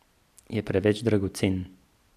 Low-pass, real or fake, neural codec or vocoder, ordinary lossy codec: 14.4 kHz; fake; vocoder, 44.1 kHz, 128 mel bands every 256 samples, BigVGAN v2; none